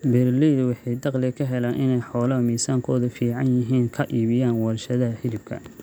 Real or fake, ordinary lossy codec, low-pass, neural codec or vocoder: real; none; none; none